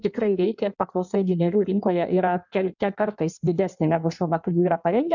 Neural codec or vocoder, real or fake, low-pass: codec, 16 kHz in and 24 kHz out, 1.1 kbps, FireRedTTS-2 codec; fake; 7.2 kHz